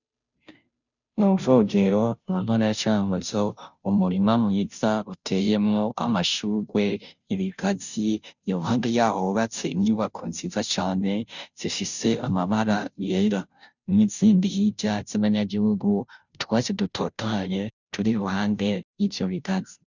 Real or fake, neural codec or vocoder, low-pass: fake; codec, 16 kHz, 0.5 kbps, FunCodec, trained on Chinese and English, 25 frames a second; 7.2 kHz